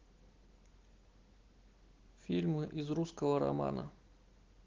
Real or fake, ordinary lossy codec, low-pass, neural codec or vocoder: real; Opus, 16 kbps; 7.2 kHz; none